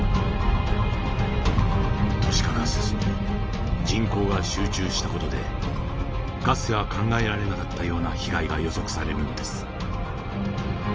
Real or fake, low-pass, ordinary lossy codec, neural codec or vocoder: real; 7.2 kHz; Opus, 24 kbps; none